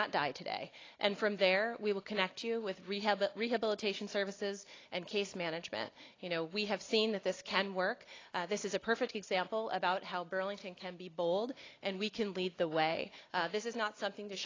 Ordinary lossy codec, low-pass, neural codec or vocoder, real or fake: AAC, 32 kbps; 7.2 kHz; none; real